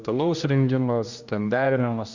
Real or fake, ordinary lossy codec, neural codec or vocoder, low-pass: fake; Opus, 64 kbps; codec, 16 kHz, 1 kbps, X-Codec, HuBERT features, trained on general audio; 7.2 kHz